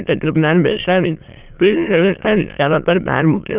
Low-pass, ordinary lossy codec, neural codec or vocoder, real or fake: 3.6 kHz; Opus, 32 kbps; autoencoder, 22.05 kHz, a latent of 192 numbers a frame, VITS, trained on many speakers; fake